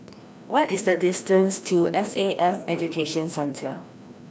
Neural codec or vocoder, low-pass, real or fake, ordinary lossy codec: codec, 16 kHz, 1 kbps, FreqCodec, larger model; none; fake; none